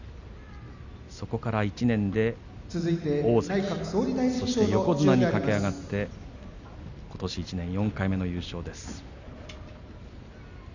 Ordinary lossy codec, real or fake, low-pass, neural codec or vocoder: none; real; 7.2 kHz; none